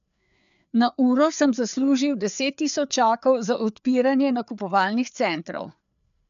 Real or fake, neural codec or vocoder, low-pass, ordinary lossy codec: fake; codec, 16 kHz, 4 kbps, FreqCodec, larger model; 7.2 kHz; none